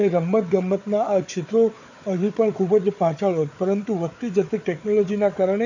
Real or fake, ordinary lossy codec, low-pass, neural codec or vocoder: fake; none; 7.2 kHz; codec, 16 kHz, 4 kbps, FunCodec, trained on Chinese and English, 50 frames a second